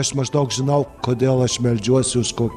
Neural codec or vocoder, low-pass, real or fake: vocoder, 44.1 kHz, 128 mel bands every 256 samples, BigVGAN v2; 14.4 kHz; fake